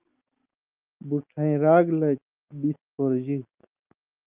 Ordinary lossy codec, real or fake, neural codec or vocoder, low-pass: Opus, 32 kbps; real; none; 3.6 kHz